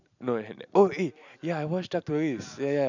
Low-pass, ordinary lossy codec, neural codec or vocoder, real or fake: 7.2 kHz; none; none; real